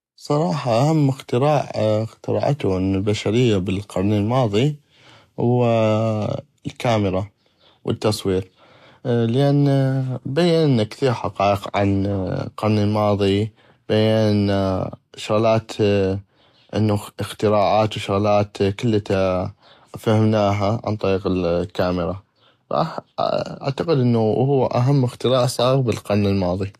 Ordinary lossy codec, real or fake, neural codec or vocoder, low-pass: AAC, 64 kbps; real; none; 14.4 kHz